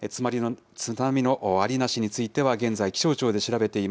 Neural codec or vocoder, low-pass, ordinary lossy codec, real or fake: none; none; none; real